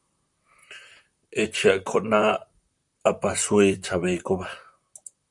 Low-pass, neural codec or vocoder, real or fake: 10.8 kHz; vocoder, 44.1 kHz, 128 mel bands, Pupu-Vocoder; fake